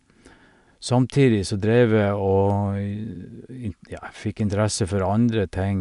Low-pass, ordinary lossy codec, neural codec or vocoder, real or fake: 10.8 kHz; none; none; real